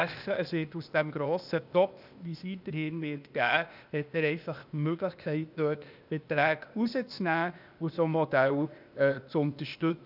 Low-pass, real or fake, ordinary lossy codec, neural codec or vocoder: 5.4 kHz; fake; none; codec, 16 kHz, 0.8 kbps, ZipCodec